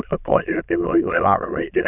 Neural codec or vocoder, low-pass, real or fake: autoencoder, 22.05 kHz, a latent of 192 numbers a frame, VITS, trained on many speakers; 3.6 kHz; fake